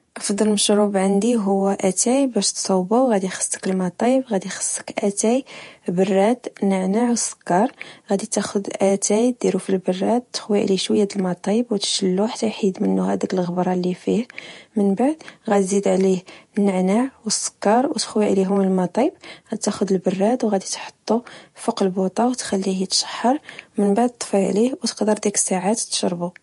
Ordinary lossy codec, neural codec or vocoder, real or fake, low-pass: MP3, 48 kbps; vocoder, 48 kHz, 128 mel bands, Vocos; fake; 14.4 kHz